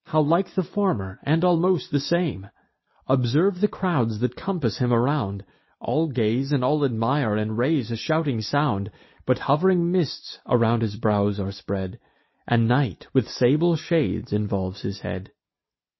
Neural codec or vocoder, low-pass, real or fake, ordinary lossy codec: none; 7.2 kHz; real; MP3, 24 kbps